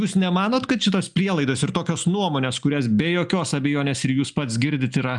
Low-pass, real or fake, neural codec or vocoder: 10.8 kHz; real; none